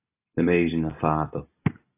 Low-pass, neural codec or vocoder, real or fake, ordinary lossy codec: 3.6 kHz; none; real; AAC, 24 kbps